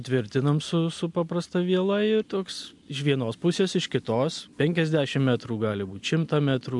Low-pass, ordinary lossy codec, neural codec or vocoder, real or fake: 10.8 kHz; MP3, 64 kbps; none; real